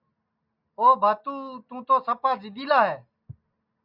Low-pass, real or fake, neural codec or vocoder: 5.4 kHz; real; none